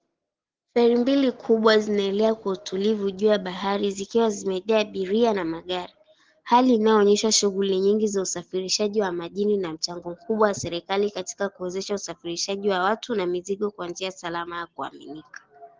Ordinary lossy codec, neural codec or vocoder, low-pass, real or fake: Opus, 16 kbps; none; 7.2 kHz; real